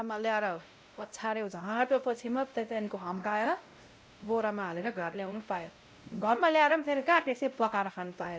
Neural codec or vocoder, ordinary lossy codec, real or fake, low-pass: codec, 16 kHz, 0.5 kbps, X-Codec, WavLM features, trained on Multilingual LibriSpeech; none; fake; none